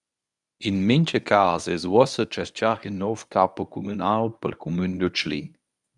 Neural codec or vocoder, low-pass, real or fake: codec, 24 kHz, 0.9 kbps, WavTokenizer, medium speech release version 1; 10.8 kHz; fake